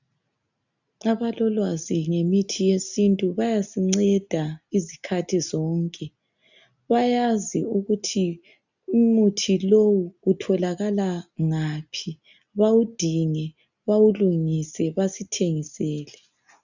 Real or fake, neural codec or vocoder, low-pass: real; none; 7.2 kHz